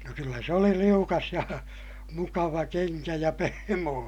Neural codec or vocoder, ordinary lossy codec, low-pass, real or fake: none; none; 19.8 kHz; real